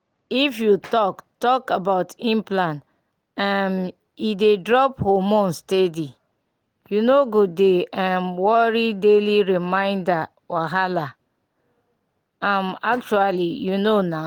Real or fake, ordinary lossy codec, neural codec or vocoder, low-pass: real; Opus, 24 kbps; none; 19.8 kHz